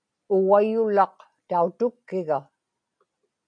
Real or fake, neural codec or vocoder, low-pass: real; none; 9.9 kHz